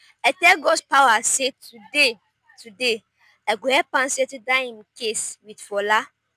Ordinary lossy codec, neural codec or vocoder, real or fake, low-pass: none; none; real; 14.4 kHz